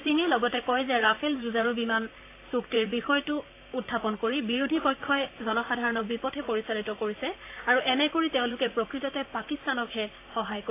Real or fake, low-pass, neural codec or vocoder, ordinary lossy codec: fake; 3.6 kHz; vocoder, 44.1 kHz, 128 mel bands, Pupu-Vocoder; AAC, 24 kbps